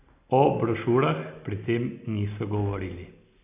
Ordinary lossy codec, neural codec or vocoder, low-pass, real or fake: AAC, 24 kbps; none; 3.6 kHz; real